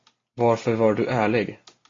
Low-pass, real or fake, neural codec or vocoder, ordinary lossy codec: 7.2 kHz; real; none; AAC, 32 kbps